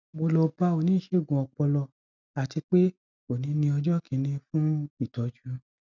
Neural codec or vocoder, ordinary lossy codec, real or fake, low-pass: none; none; real; 7.2 kHz